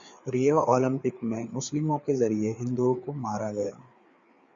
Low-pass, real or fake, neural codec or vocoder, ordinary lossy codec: 7.2 kHz; fake; codec, 16 kHz, 4 kbps, FreqCodec, larger model; Opus, 64 kbps